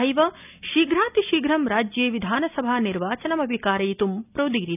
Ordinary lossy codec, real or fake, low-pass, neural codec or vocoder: none; real; 3.6 kHz; none